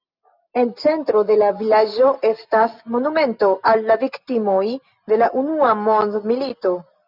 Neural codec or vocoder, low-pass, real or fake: none; 5.4 kHz; real